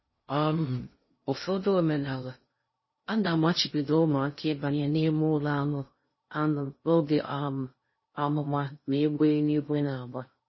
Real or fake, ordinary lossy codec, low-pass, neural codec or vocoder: fake; MP3, 24 kbps; 7.2 kHz; codec, 16 kHz in and 24 kHz out, 0.6 kbps, FocalCodec, streaming, 2048 codes